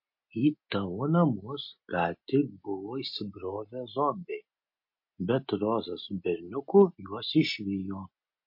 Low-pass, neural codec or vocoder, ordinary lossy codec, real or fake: 5.4 kHz; none; MP3, 32 kbps; real